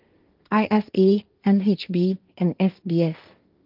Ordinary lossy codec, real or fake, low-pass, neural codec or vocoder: Opus, 32 kbps; fake; 5.4 kHz; codec, 16 kHz, 1.1 kbps, Voila-Tokenizer